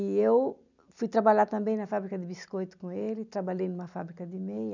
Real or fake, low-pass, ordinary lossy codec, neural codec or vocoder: real; 7.2 kHz; none; none